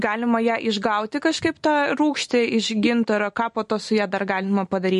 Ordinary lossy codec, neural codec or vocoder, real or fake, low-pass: MP3, 48 kbps; none; real; 14.4 kHz